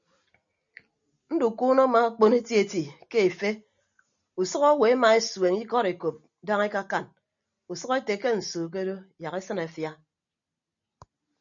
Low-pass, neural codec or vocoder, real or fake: 7.2 kHz; none; real